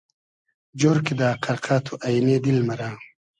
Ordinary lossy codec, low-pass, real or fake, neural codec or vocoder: MP3, 96 kbps; 10.8 kHz; real; none